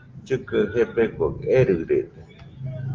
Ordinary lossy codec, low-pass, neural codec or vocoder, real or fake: Opus, 24 kbps; 7.2 kHz; none; real